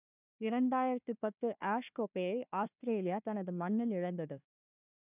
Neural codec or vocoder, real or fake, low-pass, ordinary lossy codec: codec, 16 kHz, 1 kbps, FunCodec, trained on Chinese and English, 50 frames a second; fake; 3.6 kHz; none